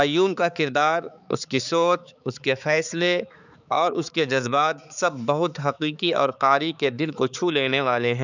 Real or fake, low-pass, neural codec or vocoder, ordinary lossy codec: fake; 7.2 kHz; codec, 16 kHz, 4 kbps, X-Codec, HuBERT features, trained on balanced general audio; none